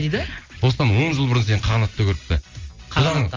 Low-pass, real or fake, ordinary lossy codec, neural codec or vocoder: 7.2 kHz; real; Opus, 32 kbps; none